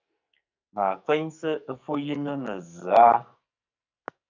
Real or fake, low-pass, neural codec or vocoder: fake; 7.2 kHz; codec, 44.1 kHz, 2.6 kbps, SNAC